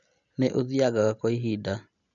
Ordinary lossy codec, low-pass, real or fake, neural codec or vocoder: none; 7.2 kHz; real; none